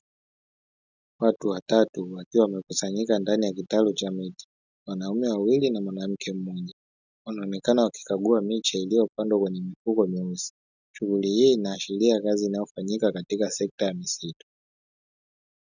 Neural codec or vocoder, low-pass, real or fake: none; 7.2 kHz; real